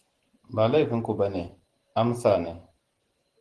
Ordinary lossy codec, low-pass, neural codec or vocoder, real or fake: Opus, 16 kbps; 10.8 kHz; none; real